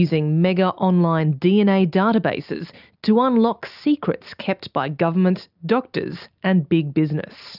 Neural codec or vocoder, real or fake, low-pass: none; real; 5.4 kHz